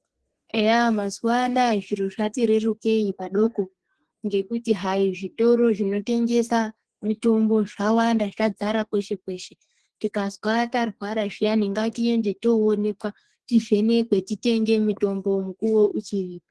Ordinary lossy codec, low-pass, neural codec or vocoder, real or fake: Opus, 16 kbps; 10.8 kHz; codec, 32 kHz, 1.9 kbps, SNAC; fake